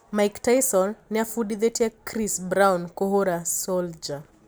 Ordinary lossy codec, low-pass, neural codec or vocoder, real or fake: none; none; none; real